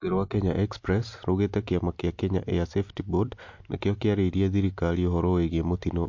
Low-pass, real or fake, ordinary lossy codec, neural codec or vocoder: 7.2 kHz; real; MP3, 48 kbps; none